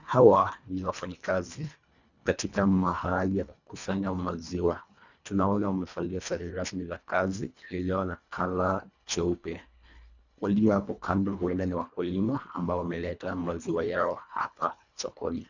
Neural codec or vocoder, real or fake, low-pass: codec, 24 kHz, 1.5 kbps, HILCodec; fake; 7.2 kHz